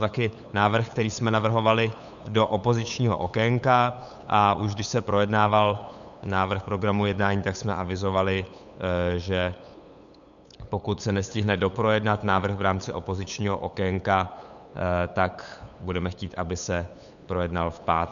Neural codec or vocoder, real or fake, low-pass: codec, 16 kHz, 8 kbps, FunCodec, trained on LibriTTS, 25 frames a second; fake; 7.2 kHz